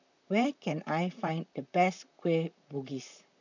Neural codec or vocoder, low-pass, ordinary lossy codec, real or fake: vocoder, 44.1 kHz, 128 mel bands, Pupu-Vocoder; 7.2 kHz; none; fake